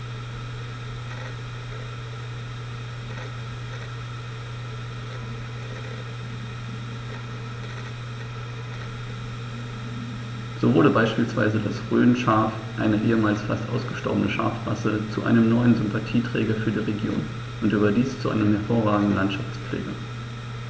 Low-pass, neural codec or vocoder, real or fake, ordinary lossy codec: none; none; real; none